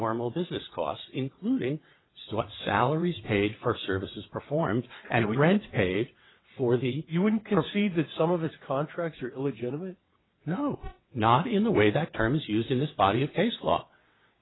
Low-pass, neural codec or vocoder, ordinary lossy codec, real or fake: 7.2 kHz; vocoder, 22.05 kHz, 80 mel bands, WaveNeXt; AAC, 16 kbps; fake